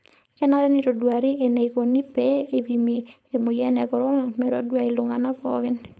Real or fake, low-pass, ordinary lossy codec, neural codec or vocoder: fake; none; none; codec, 16 kHz, 4.8 kbps, FACodec